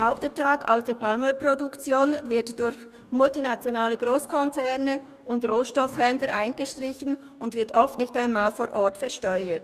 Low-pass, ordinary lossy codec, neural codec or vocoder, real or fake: 14.4 kHz; none; codec, 44.1 kHz, 2.6 kbps, DAC; fake